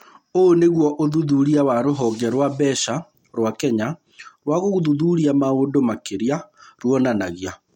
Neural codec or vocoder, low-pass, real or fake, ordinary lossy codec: none; 19.8 kHz; real; MP3, 64 kbps